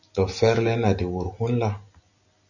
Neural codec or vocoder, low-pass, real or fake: none; 7.2 kHz; real